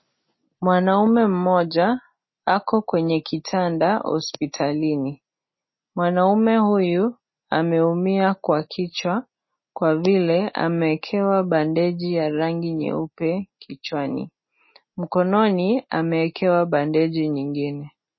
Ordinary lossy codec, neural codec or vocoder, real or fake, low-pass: MP3, 24 kbps; none; real; 7.2 kHz